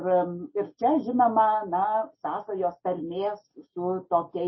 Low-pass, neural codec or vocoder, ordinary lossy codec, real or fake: 7.2 kHz; none; MP3, 24 kbps; real